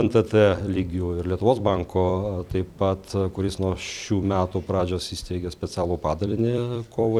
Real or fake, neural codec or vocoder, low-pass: fake; vocoder, 44.1 kHz, 128 mel bands every 256 samples, BigVGAN v2; 19.8 kHz